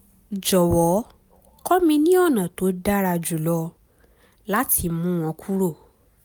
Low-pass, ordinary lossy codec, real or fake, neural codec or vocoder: none; none; real; none